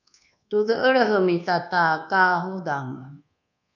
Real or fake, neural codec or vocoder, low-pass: fake; codec, 24 kHz, 1.2 kbps, DualCodec; 7.2 kHz